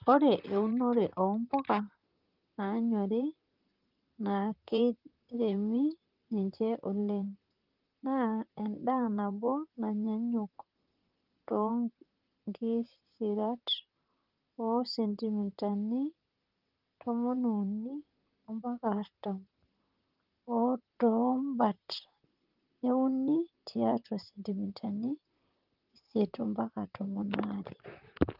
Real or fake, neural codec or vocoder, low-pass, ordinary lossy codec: fake; vocoder, 44.1 kHz, 128 mel bands, Pupu-Vocoder; 5.4 kHz; Opus, 32 kbps